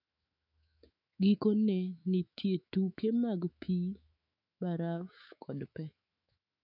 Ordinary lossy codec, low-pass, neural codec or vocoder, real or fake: none; 5.4 kHz; autoencoder, 48 kHz, 128 numbers a frame, DAC-VAE, trained on Japanese speech; fake